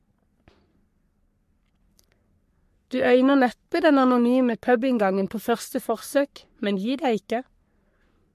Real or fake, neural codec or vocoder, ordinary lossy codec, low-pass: fake; codec, 44.1 kHz, 3.4 kbps, Pupu-Codec; MP3, 64 kbps; 14.4 kHz